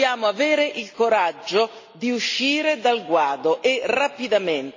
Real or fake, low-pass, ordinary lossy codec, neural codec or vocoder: real; 7.2 kHz; MP3, 32 kbps; none